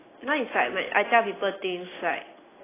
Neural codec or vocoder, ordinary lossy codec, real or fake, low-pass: none; AAC, 16 kbps; real; 3.6 kHz